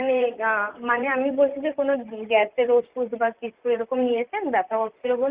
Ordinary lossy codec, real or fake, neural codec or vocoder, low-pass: Opus, 24 kbps; fake; vocoder, 44.1 kHz, 128 mel bands, Pupu-Vocoder; 3.6 kHz